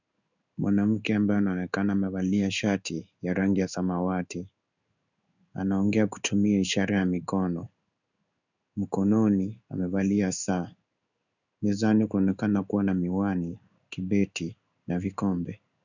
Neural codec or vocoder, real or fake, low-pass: codec, 16 kHz in and 24 kHz out, 1 kbps, XY-Tokenizer; fake; 7.2 kHz